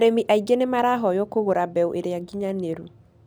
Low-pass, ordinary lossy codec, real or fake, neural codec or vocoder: none; none; real; none